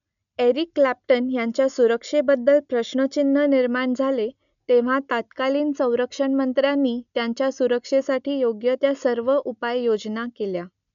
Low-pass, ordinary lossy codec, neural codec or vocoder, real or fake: 7.2 kHz; none; none; real